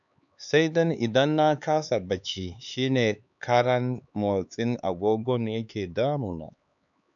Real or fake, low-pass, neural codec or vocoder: fake; 7.2 kHz; codec, 16 kHz, 4 kbps, X-Codec, HuBERT features, trained on LibriSpeech